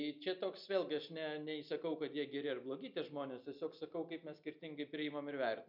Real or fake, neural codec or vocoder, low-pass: real; none; 5.4 kHz